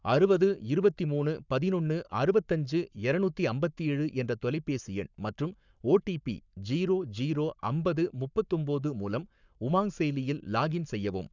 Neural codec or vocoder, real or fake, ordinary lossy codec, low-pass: codec, 44.1 kHz, 7.8 kbps, Pupu-Codec; fake; none; 7.2 kHz